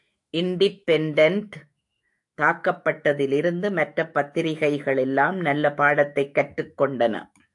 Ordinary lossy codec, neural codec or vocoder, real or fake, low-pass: AAC, 64 kbps; autoencoder, 48 kHz, 128 numbers a frame, DAC-VAE, trained on Japanese speech; fake; 10.8 kHz